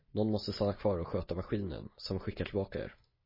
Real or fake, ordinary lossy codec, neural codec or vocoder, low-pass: real; MP3, 24 kbps; none; 5.4 kHz